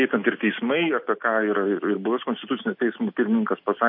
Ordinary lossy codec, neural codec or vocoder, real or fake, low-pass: MP3, 32 kbps; none; real; 5.4 kHz